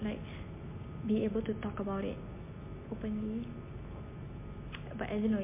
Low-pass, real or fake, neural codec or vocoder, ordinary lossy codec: 3.6 kHz; real; none; MP3, 24 kbps